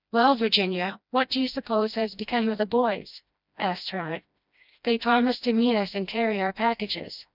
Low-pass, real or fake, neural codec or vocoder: 5.4 kHz; fake; codec, 16 kHz, 1 kbps, FreqCodec, smaller model